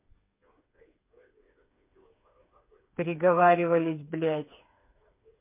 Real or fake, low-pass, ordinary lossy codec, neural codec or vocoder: fake; 3.6 kHz; MP3, 24 kbps; codec, 16 kHz, 4 kbps, FreqCodec, smaller model